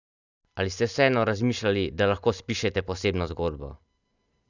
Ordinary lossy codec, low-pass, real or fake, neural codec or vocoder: none; 7.2 kHz; real; none